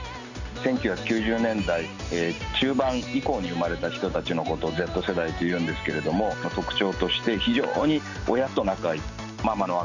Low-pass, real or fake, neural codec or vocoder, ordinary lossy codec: 7.2 kHz; real; none; none